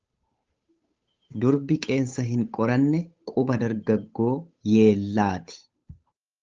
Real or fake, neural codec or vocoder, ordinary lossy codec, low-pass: fake; codec, 16 kHz, 8 kbps, FunCodec, trained on Chinese and English, 25 frames a second; Opus, 24 kbps; 7.2 kHz